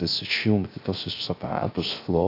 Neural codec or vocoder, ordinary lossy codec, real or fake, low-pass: codec, 16 kHz, 0.3 kbps, FocalCodec; AAC, 32 kbps; fake; 5.4 kHz